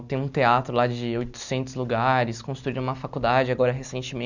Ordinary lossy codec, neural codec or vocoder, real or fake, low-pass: none; none; real; 7.2 kHz